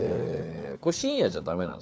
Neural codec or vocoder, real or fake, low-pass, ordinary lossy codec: codec, 16 kHz, 4 kbps, FunCodec, trained on LibriTTS, 50 frames a second; fake; none; none